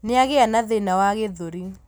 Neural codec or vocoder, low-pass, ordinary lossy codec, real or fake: none; none; none; real